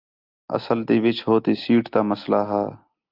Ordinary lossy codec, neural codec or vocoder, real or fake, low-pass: Opus, 32 kbps; none; real; 5.4 kHz